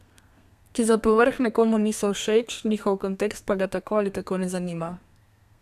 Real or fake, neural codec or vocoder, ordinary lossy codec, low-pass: fake; codec, 32 kHz, 1.9 kbps, SNAC; none; 14.4 kHz